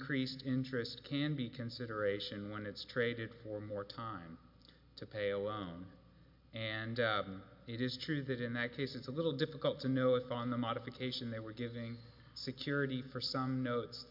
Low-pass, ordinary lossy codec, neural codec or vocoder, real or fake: 5.4 kHz; MP3, 48 kbps; none; real